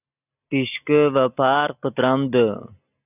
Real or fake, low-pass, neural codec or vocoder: real; 3.6 kHz; none